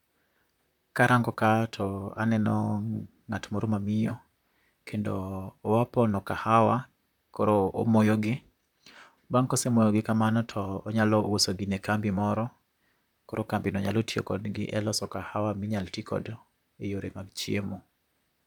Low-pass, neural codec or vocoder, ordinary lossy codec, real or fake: 19.8 kHz; vocoder, 44.1 kHz, 128 mel bands, Pupu-Vocoder; none; fake